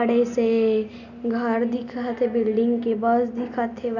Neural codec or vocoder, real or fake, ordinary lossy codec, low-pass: none; real; none; 7.2 kHz